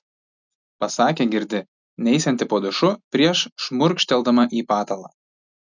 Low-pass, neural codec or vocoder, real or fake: 7.2 kHz; none; real